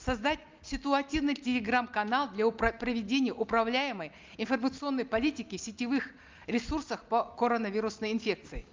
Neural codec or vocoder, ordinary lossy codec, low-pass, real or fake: none; Opus, 32 kbps; 7.2 kHz; real